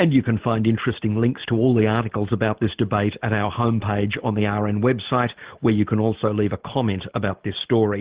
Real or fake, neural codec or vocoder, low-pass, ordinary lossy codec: real; none; 3.6 kHz; Opus, 16 kbps